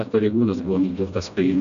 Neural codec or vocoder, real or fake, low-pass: codec, 16 kHz, 1 kbps, FreqCodec, smaller model; fake; 7.2 kHz